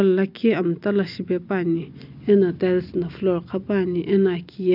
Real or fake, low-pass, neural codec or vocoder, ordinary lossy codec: real; 5.4 kHz; none; none